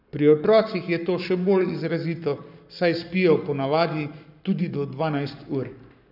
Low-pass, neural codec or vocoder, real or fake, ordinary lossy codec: 5.4 kHz; codec, 44.1 kHz, 7.8 kbps, Pupu-Codec; fake; none